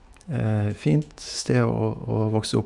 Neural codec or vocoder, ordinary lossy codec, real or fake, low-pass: autoencoder, 48 kHz, 128 numbers a frame, DAC-VAE, trained on Japanese speech; none; fake; 10.8 kHz